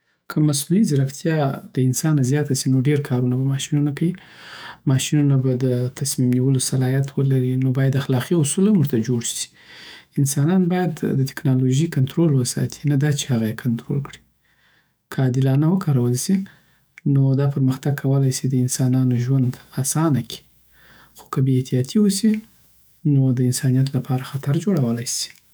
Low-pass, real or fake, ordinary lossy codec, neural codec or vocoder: none; fake; none; autoencoder, 48 kHz, 128 numbers a frame, DAC-VAE, trained on Japanese speech